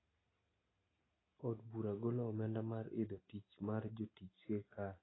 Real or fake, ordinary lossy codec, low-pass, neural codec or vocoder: real; AAC, 16 kbps; 7.2 kHz; none